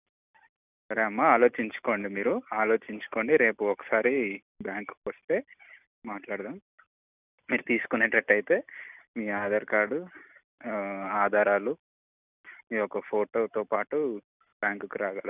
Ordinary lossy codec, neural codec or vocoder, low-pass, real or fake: none; none; 3.6 kHz; real